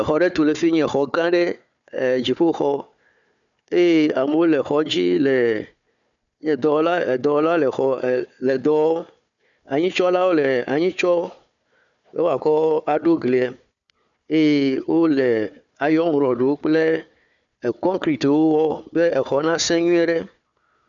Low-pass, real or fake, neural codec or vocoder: 7.2 kHz; fake; codec, 16 kHz, 4 kbps, FunCodec, trained on Chinese and English, 50 frames a second